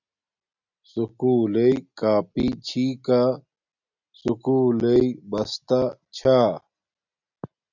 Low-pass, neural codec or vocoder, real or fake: 7.2 kHz; none; real